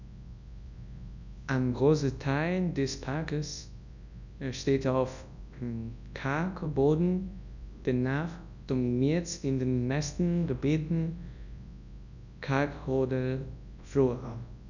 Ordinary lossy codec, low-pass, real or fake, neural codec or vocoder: none; 7.2 kHz; fake; codec, 24 kHz, 0.9 kbps, WavTokenizer, large speech release